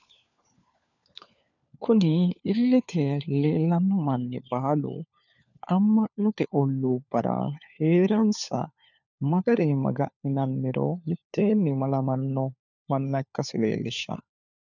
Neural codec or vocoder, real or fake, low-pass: codec, 16 kHz, 4 kbps, FunCodec, trained on LibriTTS, 50 frames a second; fake; 7.2 kHz